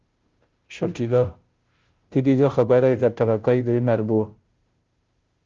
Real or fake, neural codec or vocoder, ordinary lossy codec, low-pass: fake; codec, 16 kHz, 0.5 kbps, FunCodec, trained on Chinese and English, 25 frames a second; Opus, 32 kbps; 7.2 kHz